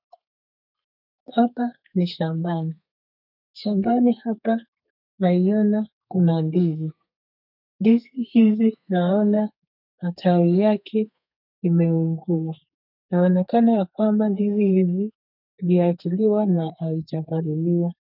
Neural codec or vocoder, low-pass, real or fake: codec, 32 kHz, 1.9 kbps, SNAC; 5.4 kHz; fake